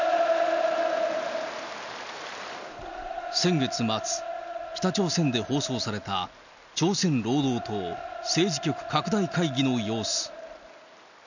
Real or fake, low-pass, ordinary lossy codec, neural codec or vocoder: real; 7.2 kHz; none; none